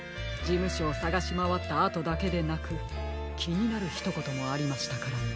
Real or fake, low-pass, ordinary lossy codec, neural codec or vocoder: real; none; none; none